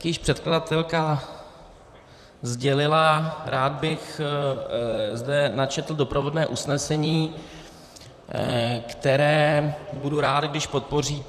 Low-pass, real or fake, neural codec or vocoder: 14.4 kHz; fake; vocoder, 44.1 kHz, 128 mel bands, Pupu-Vocoder